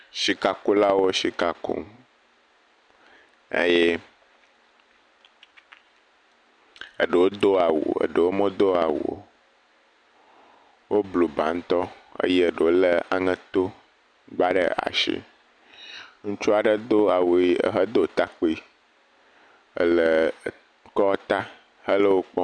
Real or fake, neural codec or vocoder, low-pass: real; none; 9.9 kHz